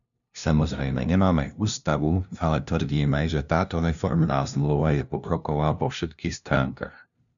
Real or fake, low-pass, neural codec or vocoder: fake; 7.2 kHz; codec, 16 kHz, 0.5 kbps, FunCodec, trained on LibriTTS, 25 frames a second